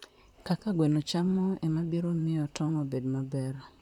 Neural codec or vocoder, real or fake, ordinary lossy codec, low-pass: vocoder, 44.1 kHz, 128 mel bands, Pupu-Vocoder; fake; none; 19.8 kHz